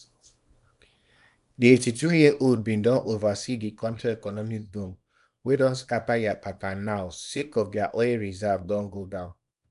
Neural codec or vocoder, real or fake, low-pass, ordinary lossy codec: codec, 24 kHz, 0.9 kbps, WavTokenizer, small release; fake; 10.8 kHz; none